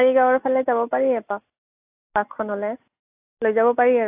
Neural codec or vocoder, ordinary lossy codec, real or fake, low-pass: none; AAC, 24 kbps; real; 3.6 kHz